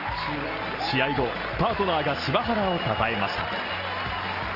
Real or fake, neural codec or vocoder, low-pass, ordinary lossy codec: real; none; 5.4 kHz; Opus, 24 kbps